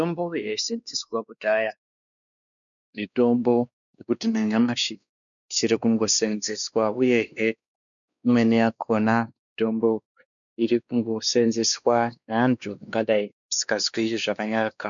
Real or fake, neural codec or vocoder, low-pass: fake; codec, 16 kHz, 2 kbps, X-Codec, WavLM features, trained on Multilingual LibriSpeech; 7.2 kHz